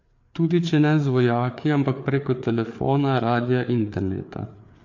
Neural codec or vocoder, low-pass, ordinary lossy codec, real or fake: codec, 16 kHz, 4 kbps, FreqCodec, larger model; 7.2 kHz; MP3, 48 kbps; fake